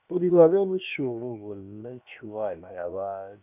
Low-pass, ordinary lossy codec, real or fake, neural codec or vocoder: 3.6 kHz; none; fake; codec, 16 kHz, about 1 kbps, DyCAST, with the encoder's durations